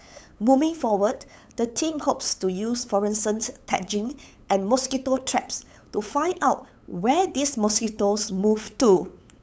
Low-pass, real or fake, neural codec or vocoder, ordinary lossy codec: none; fake; codec, 16 kHz, 8 kbps, FunCodec, trained on LibriTTS, 25 frames a second; none